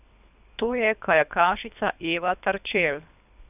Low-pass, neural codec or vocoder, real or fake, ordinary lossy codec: 3.6 kHz; codec, 24 kHz, 3 kbps, HILCodec; fake; none